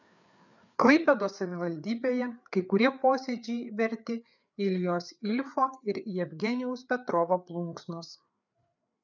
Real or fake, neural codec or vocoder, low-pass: fake; codec, 16 kHz, 4 kbps, FreqCodec, larger model; 7.2 kHz